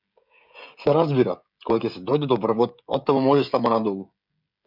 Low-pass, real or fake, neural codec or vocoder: 5.4 kHz; fake; codec, 16 kHz, 16 kbps, FreqCodec, smaller model